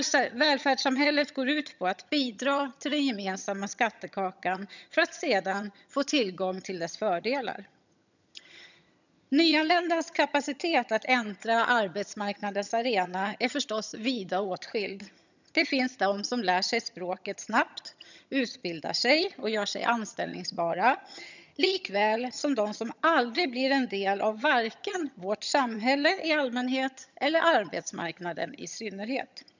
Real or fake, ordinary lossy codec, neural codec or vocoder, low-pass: fake; none; vocoder, 22.05 kHz, 80 mel bands, HiFi-GAN; 7.2 kHz